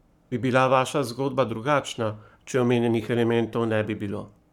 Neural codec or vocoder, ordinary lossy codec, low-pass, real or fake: codec, 44.1 kHz, 7.8 kbps, Pupu-Codec; none; 19.8 kHz; fake